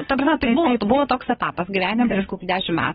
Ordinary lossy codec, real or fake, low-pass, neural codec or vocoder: AAC, 16 kbps; fake; 7.2 kHz; codec, 16 kHz, 8 kbps, FunCodec, trained on Chinese and English, 25 frames a second